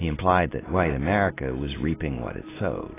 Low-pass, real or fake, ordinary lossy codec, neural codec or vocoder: 3.6 kHz; real; AAC, 16 kbps; none